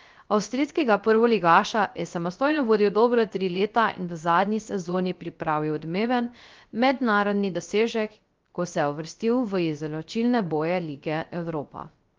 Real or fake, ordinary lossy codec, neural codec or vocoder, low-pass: fake; Opus, 32 kbps; codec, 16 kHz, 0.3 kbps, FocalCodec; 7.2 kHz